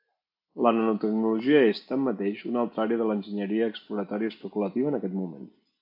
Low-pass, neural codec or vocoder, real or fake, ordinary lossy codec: 5.4 kHz; none; real; AAC, 48 kbps